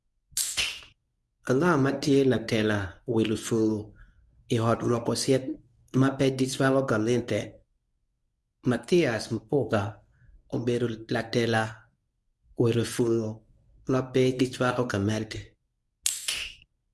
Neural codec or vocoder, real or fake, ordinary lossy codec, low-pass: codec, 24 kHz, 0.9 kbps, WavTokenizer, medium speech release version 1; fake; none; none